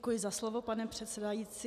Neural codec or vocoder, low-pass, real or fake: none; 14.4 kHz; real